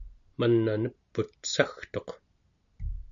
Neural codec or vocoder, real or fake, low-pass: none; real; 7.2 kHz